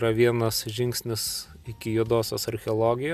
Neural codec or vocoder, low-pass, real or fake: none; 14.4 kHz; real